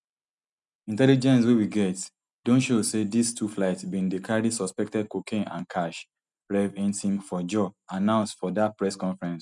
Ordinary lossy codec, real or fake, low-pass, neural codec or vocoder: none; real; 10.8 kHz; none